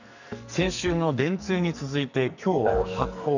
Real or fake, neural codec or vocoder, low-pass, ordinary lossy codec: fake; codec, 44.1 kHz, 2.6 kbps, SNAC; 7.2 kHz; none